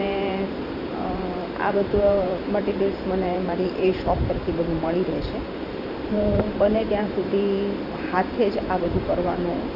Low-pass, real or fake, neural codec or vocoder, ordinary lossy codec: 5.4 kHz; fake; vocoder, 44.1 kHz, 128 mel bands every 512 samples, BigVGAN v2; none